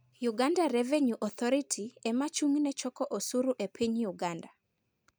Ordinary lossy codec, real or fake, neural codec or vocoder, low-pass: none; real; none; none